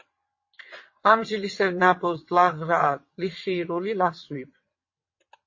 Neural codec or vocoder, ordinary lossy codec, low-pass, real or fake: vocoder, 22.05 kHz, 80 mel bands, WaveNeXt; MP3, 32 kbps; 7.2 kHz; fake